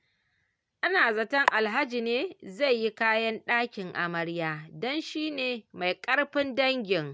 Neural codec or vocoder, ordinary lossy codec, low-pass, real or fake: none; none; none; real